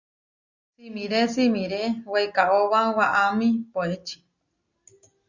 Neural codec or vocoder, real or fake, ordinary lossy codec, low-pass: none; real; Opus, 64 kbps; 7.2 kHz